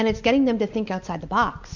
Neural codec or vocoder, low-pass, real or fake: none; 7.2 kHz; real